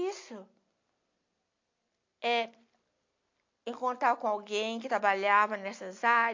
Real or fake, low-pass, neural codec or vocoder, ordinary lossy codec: real; 7.2 kHz; none; MP3, 48 kbps